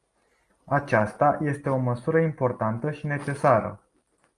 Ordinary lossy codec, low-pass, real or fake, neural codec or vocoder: Opus, 32 kbps; 10.8 kHz; real; none